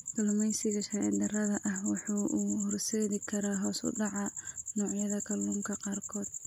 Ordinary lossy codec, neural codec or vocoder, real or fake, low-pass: none; none; real; 19.8 kHz